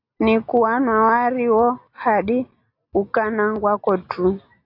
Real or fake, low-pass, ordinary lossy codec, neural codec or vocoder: real; 5.4 kHz; AAC, 32 kbps; none